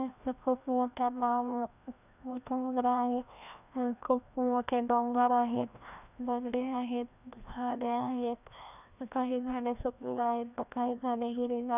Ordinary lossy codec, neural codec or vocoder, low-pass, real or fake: none; codec, 16 kHz, 1 kbps, FreqCodec, larger model; 3.6 kHz; fake